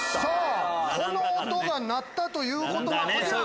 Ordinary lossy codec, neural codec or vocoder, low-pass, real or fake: none; none; none; real